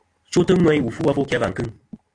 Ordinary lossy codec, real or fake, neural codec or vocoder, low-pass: AAC, 48 kbps; real; none; 9.9 kHz